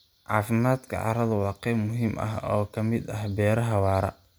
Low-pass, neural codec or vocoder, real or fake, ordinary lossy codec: none; none; real; none